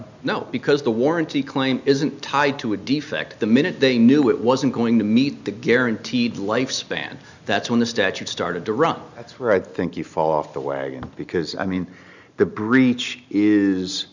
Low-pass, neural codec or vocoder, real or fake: 7.2 kHz; none; real